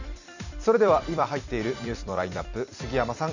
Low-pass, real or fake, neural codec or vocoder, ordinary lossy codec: 7.2 kHz; fake; vocoder, 44.1 kHz, 128 mel bands every 256 samples, BigVGAN v2; none